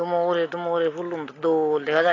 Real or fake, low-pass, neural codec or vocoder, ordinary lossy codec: real; 7.2 kHz; none; AAC, 32 kbps